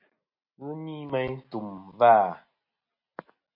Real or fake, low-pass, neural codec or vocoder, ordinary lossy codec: real; 5.4 kHz; none; MP3, 32 kbps